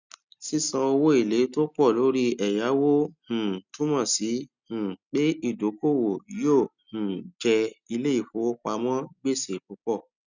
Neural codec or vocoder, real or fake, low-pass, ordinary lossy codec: none; real; 7.2 kHz; none